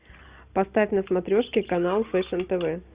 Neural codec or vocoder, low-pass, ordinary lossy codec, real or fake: none; 3.6 kHz; Opus, 64 kbps; real